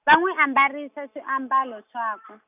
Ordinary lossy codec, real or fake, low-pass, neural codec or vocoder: none; real; 3.6 kHz; none